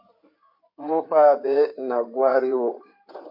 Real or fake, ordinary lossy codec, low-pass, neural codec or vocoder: fake; MP3, 32 kbps; 5.4 kHz; codec, 16 kHz in and 24 kHz out, 2.2 kbps, FireRedTTS-2 codec